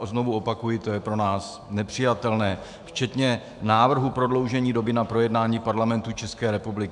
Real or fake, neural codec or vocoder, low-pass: fake; autoencoder, 48 kHz, 128 numbers a frame, DAC-VAE, trained on Japanese speech; 10.8 kHz